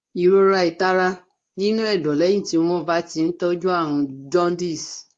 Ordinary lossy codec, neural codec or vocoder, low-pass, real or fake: AAC, 48 kbps; codec, 24 kHz, 0.9 kbps, WavTokenizer, medium speech release version 2; 10.8 kHz; fake